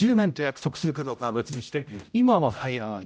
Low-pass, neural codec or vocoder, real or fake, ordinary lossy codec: none; codec, 16 kHz, 0.5 kbps, X-Codec, HuBERT features, trained on general audio; fake; none